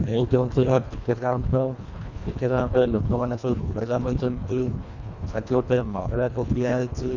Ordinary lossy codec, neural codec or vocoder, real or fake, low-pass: none; codec, 24 kHz, 1.5 kbps, HILCodec; fake; 7.2 kHz